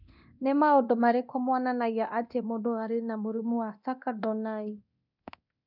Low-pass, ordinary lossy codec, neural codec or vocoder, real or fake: 5.4 kHz; none; codec, 24 kHz, 0.9 kbps, DualCodec; fake